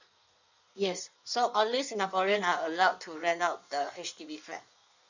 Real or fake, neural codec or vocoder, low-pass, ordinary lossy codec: fake; codec, 16 kHz in and 24 kHz out, 1.1 kbps, FireRedTTS-2 codec; 7.2 kHz; none